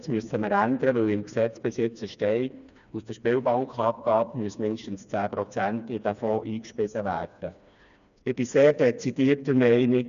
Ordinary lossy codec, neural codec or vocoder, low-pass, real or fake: none; codec, 16 kHz, 2 kbps, FreqCodec, smaller model; 7.2 kHz; fake